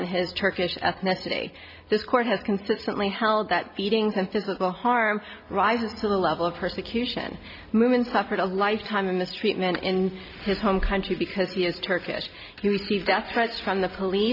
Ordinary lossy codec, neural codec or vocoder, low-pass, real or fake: AAC, 48 kbps; none; 5.4 kHz; real